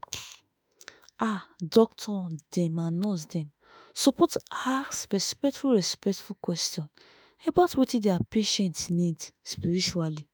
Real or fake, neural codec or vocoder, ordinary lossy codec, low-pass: fake; autoencoder, 48 kHz, 32 numbers a frame, DAC-VAE, trained on Japanese speech; none; none